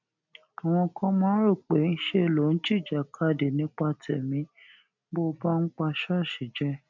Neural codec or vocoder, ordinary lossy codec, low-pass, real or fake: none; none; 7.2 kHz; real